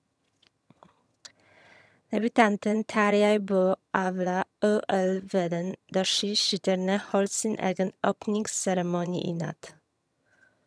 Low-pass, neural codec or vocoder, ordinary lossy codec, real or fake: none; vocoder, 22.05 kHz, 80 mel bands, HiFi-GAN; none; fake